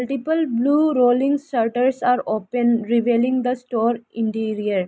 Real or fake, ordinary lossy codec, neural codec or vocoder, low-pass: real; none; none; none